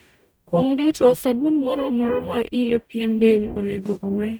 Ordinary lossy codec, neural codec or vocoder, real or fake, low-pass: none; codec, 44.1 kHz, 0.9 kbps, DAC; fake; none